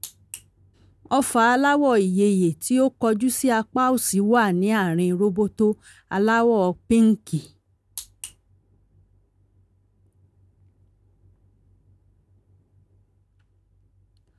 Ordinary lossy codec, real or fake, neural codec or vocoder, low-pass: none; real; none; none